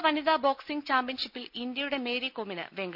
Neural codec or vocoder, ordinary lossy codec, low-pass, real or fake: none; none; 5.4 kHz; real